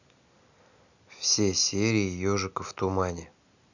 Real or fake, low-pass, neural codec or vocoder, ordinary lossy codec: real; 7.2 kHz; none; none